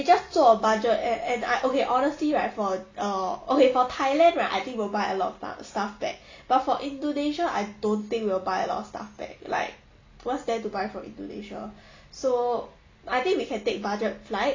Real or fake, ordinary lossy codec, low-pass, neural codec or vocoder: real; MP3, 48 kbps; 7.2 kHz; none